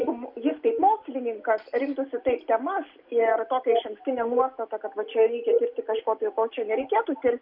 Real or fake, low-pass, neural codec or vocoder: real; 5.4 kHz; none